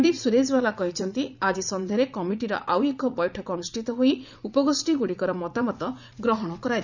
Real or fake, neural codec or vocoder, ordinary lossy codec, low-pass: fake; vocoder, 44.1 kHz, 80 mel bands, Vocos; none; 7.2 kHz